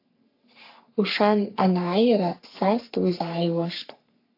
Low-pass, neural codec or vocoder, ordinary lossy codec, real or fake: 5.4 kHz; codec, 44.1 kHz, 3.4 kbps, Pupu-Codec; AAC, 32 kbps; fake